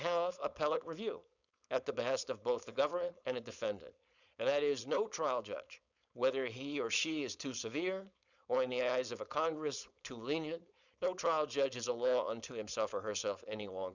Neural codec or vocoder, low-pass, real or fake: codec, 16 kHz, 4.8 kbps, FACodec; 7.2 kHz; fake